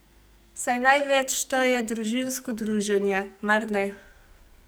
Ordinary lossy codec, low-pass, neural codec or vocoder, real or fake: none; none; codec, 44.1 kHz, 2.6 kbps, SNAC; fake